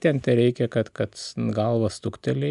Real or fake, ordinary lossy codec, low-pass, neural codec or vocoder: real; AAC, 96 kbps; 10.8 kHz; none